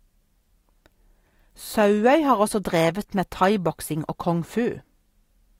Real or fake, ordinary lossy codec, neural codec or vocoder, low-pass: real; AAC, 48 kbps; none; 19.8 kHz